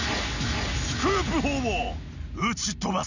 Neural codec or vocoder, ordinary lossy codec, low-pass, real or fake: none; none; 7.2 kHz; real